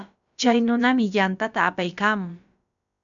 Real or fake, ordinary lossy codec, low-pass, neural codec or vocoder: fake; MP3, 96 kbps; 7.2 kHz; codec, 16 kHz, about 1 kbps, DyCAST, with the encoder's durations